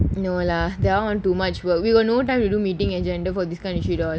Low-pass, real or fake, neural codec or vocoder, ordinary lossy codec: none; real; none; none